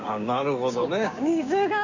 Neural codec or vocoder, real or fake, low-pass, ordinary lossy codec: codec, 16 kHz, 6 kbps, DAC; fake; 7.2 kHz; none